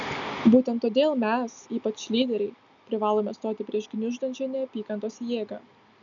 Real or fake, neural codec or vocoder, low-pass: real; none; 7.2 kHz